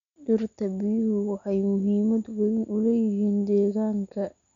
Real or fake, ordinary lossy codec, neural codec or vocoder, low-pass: real; MP3, 96 kbps; none; 7.2 kHz